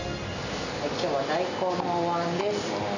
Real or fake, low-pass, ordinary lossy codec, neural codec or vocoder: real; 7.2 kHz; none; none